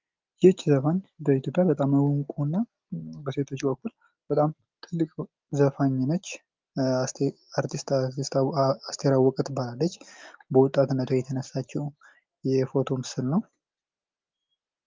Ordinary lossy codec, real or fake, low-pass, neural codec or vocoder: Opus, 32 kbps; real; 7.2 kHz; none